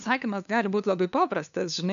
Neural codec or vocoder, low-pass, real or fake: codec, 16 kHz, 2 kbps, FunCodec, trained on LibriTTS, 25 frames a second; 7.2 kHz; fake